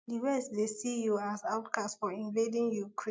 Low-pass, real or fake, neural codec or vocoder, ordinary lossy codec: none; real; none; none